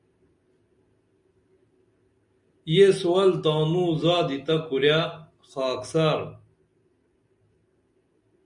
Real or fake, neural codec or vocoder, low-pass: real; none; 10.8 kHz